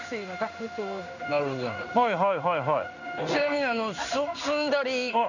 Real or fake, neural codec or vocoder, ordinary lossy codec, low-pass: fake; codec, 16 kHz in and 24 kHz out, 1 kbps, XY-Tokenizer; none; 7.2 kHz